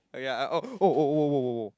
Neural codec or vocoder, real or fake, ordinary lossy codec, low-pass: none; real; none; none